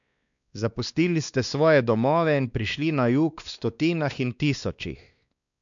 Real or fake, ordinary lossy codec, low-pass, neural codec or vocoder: fake; MP3, 96 kbps; 7.2 kHz; codec, 16 kHz, 2 kbps, X-Codec, WavLM features, trained on Multilingual LibriSpeech